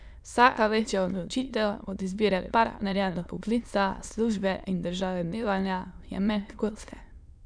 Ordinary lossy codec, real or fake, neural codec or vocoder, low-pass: none; fake; autoencoder, 22.05 kHz, a latent of 192 numbers a frame, VITS, trained on many speakers; 9.9 kHz